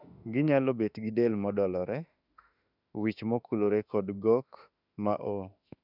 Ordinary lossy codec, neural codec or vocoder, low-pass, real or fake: none; autoencoder, 48 kHz, 32 numbers a frame, DAC-VAE, trained on Japanese speech; 5.4 kHz; fake